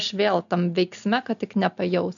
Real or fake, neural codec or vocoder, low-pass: real; none; 7.2 kHz